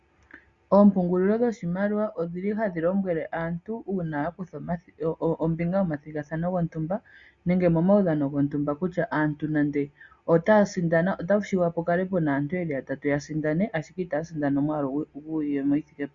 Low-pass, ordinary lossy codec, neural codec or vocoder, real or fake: 7.2 kHz; Opus, 64 kbps; none; real